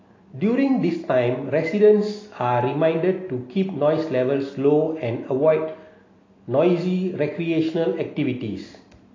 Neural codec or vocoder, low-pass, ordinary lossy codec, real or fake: none; 7.2 kHz; AAC, 32 kbps; real